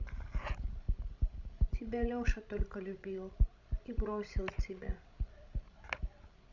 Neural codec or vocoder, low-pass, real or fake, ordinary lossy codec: codec, 16 kHz, 16 kbps, FreqCodec, larger model; 7.2 kHz; fake; none